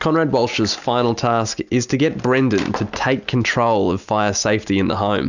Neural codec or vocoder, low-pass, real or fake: none; 7.2 kHz; real